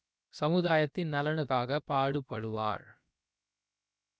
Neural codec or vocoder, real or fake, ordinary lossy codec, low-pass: codec, 16 kHz, about 1 kbps, DyCAST, with the encoder's durations; fake; none; none